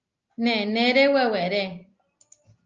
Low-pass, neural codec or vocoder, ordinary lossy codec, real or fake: 7.2 kHz; none; Opus, 24 kbps; real